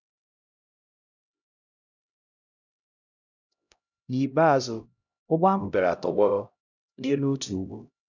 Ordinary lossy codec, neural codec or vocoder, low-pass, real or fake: none; codec, 16 kHz, 0.5 kbps, X-Codec, HuBERT features, trained on LibriSpeech; 7.2 kHz; fake